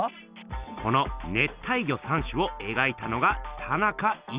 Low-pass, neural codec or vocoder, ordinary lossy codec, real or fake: 3.6 kHz; none; Opus, 64 kbps; real